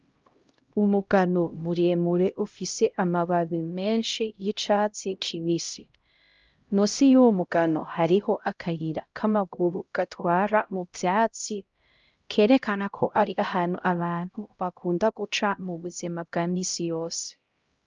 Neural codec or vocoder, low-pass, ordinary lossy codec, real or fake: codec, 16 kHz, 0.5 kbps, X-Codec, HuBERT features, trained on LibriSpeech; 7.2 kHz; Opus, 24 kbps; fake